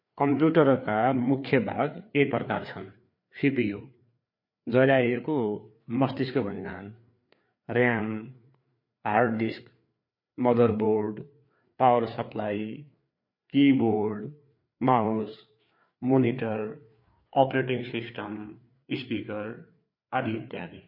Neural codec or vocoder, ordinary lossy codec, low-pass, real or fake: codec, 16 kHz, 4 kbps, FreqCodec, larger model; MP3, 32 kbps; 5.4 kHz; fake